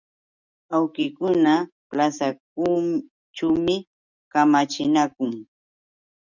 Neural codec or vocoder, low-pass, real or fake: none; 7.2 kHz; real